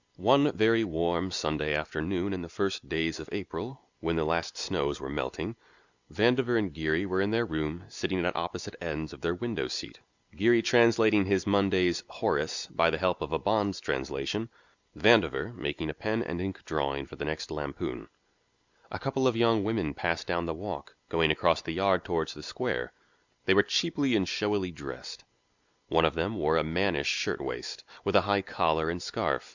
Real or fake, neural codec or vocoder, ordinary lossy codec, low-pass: real; none; Opus, 64 kbps; 7.2 kHz